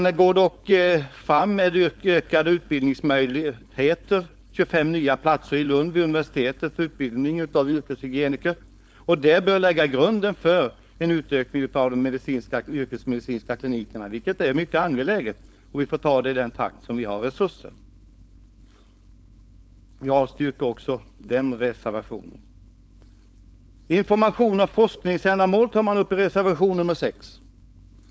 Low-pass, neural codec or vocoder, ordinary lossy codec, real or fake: none; codec, 16 kHz, 4.8 kbps, FACodec; none; fake